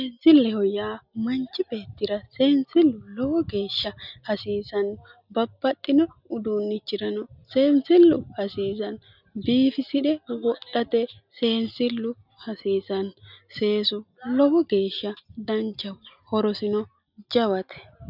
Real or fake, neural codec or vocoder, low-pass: real; none; 5.4 kHz